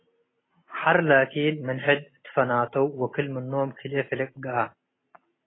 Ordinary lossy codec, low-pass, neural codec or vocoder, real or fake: AAC, 16 kbps; 7.2 kHz; none; real